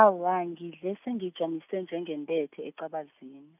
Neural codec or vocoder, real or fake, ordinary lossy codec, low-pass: none; real; none; 3.6 kHz